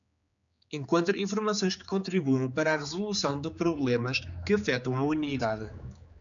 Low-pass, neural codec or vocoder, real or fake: 7.2 kHz; codec, 16 kHz, 4 kbps, X-Codec, HuBERT features, trained on general audio; fake